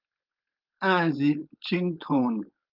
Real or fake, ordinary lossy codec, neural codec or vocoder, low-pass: fake; Opus, 24 kbps; codec, 16 kHz, 4.8 kbps, FACodec; 5.4 kHz